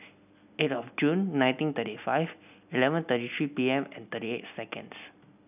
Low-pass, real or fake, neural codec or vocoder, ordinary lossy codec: 3.6 kHz; real; none; none